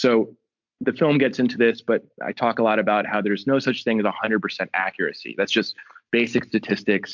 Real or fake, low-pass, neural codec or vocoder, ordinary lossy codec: real; 7.2 kHz; none; MP3, 64 kbps